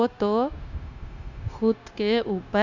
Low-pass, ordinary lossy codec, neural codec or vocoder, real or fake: 7.2 kHz; none; codec, 16 kHz, 0.9 kbps, LongCat-Audio-Codec; fake